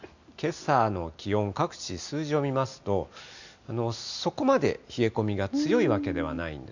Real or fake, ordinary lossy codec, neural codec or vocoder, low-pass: real; none; none; 7.2 kHz